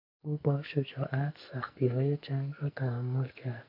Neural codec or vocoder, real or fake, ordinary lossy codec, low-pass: codec, 44.1 kHz, 2.6 kbps, SNAC; fake; MP3, 48 kbps; 5.4 kHz